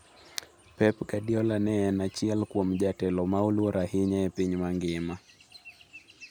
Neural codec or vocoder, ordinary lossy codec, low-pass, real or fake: none; none; none; real